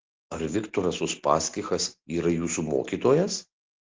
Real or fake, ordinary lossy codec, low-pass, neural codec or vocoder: real; Opus, 16 kbps; 7.2 kHz; none